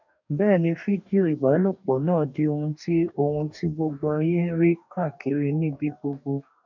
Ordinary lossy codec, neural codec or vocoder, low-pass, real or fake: none; codec, 44.1 kHz, 2.6 kbps, DAC; 7.2 kHz; fake